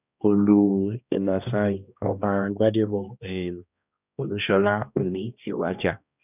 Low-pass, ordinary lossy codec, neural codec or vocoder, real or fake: 3.6 kHz; none; codec, 16 kHz, 1 kbps, X-Codec, HuBERT features, trained on balanced general audio; fake